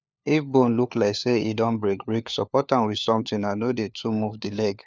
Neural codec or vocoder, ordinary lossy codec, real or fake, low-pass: codec, 16 kHz, 4 kbps, FunCodec, trained on LibriTTS, 50 frames a second; none; fake; none